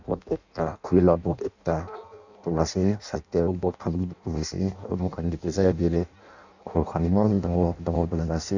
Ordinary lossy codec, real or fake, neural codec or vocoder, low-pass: none; fake; codec, 16 kHz in and 24 kHz out, 0.6 kbps, FireRedTTS-2 codec; 7.2 kHz